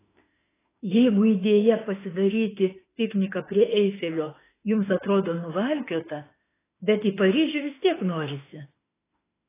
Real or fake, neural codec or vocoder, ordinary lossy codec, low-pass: fake; autoencoder, 48 kHz, 32 numbers a frame, DAC-VAE, trained on Japanese speech; AAC, 16 kbps; 3.6 kHz